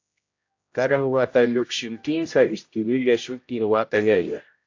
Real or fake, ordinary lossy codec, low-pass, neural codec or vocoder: fake; AAC, 48 kbps; 7.2 kHz; codec, 16 kHz, 0.5 kbps, X-Codec, HuBERT features, trained on general audio